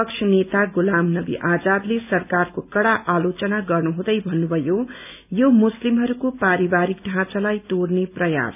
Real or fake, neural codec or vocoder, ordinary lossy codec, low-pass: real; none; none; 3.6 kHz